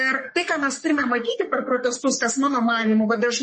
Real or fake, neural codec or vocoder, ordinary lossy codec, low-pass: fake; codec, 44.1 kHz, 3.4 kbps, Pupu-Codec; MP3, 32 kbps; 10.8 kHz